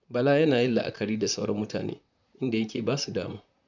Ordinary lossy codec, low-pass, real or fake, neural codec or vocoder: none; 7.2 kHz; fake; vocoder, 44.1 kHz, 128 mel bands, Pupu-Vocoder